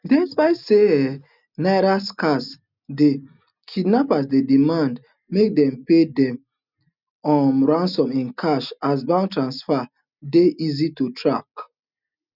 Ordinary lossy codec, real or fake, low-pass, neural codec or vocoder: none; real; 5.4 kHz; none